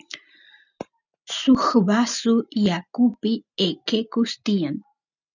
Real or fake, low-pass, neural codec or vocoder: real; 7.2 kHz; none